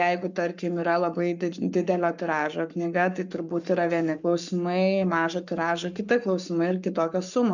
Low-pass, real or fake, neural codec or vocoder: 7.2 kHz; fake; codec, 44.1 kHz, 7.8 kbps, Pupu-Codec